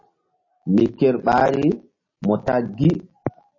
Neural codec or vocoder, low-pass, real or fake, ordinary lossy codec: none; 7.2 kHz; real; MP3, 32 kbps